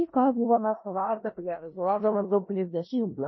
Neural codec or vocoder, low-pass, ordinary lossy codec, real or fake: codec, 16 kHz in and 24 kHz out, 0.4 kbps, LongCat-Audio-Codec, four codebook decoder; 7.2 kHz; MP3, 24 kbps; fake